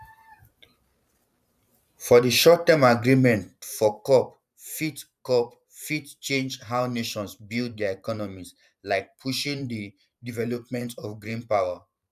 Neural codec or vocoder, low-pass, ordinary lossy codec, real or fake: vocoder, 44.1 kHz, 128 mel bands every 512 samples, BigVGAN v2; 14.4 kHz; none; fake